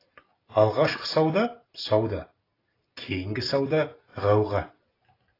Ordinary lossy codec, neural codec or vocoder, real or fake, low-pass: AAC, 24 kbps; none; real; 5.4 kHz